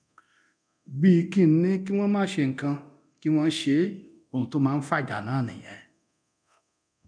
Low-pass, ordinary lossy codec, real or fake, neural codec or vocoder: 9.9 kHz; none; fake; codec, 24 kHz, 0.9 kbps, DualCodec